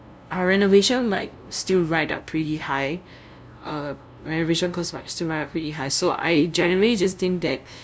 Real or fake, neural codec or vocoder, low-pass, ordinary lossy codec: fake; codec, 16 kHz, 0.5 kbps, FunCodec, trained on LibriTTS, 25 frames a second; none; none